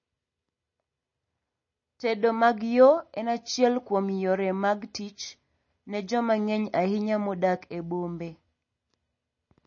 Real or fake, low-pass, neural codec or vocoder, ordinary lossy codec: real; 7.2 kHz; none; MP3, 32 kbps